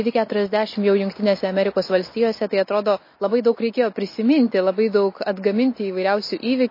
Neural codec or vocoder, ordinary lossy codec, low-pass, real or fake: none; MP3, 24 kbps; 5.4 kHz; real